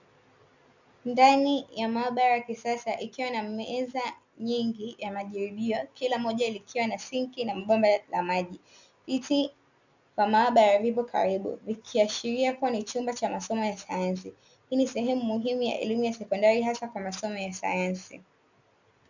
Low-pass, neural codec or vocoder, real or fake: 7.2 kHz; none; real